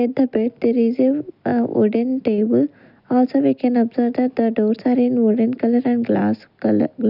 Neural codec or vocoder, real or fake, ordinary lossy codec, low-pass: none; real; none; 5.4 kHz